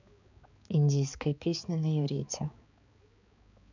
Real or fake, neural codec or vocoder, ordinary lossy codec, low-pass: fake; codec, 16 kHz, 4 kbps, X-Codec, HuBERT features, trained on balanced general audio; none; 7.2 kHz